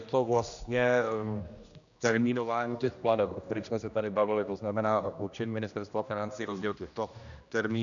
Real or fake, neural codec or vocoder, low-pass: fake; codec, 16 kHz, 1 kbps, X-Codec, HuBERT features, trained on general audio; 7.2 kHz